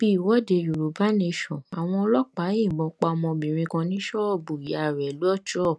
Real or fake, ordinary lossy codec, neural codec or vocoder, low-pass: real; none; none; none